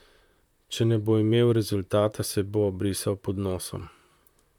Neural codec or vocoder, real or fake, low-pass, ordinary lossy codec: vocoder, 44.1 kHz, 128 mel bands, Pupu-Vocoder; fake; 19.8 kHz; none